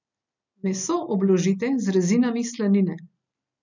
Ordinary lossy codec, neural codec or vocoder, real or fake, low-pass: none; none; real; 7.2 kHz